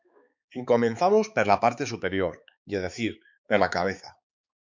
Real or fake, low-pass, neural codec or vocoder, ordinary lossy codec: fake; 7.2 kHz; codec, 16 kHz, 4 kbps, X-Codec, HuBERT features, trained on balanced general audio; MP3, 64 kbps